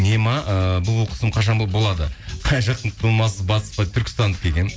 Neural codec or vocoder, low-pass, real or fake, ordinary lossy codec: none; none; real; none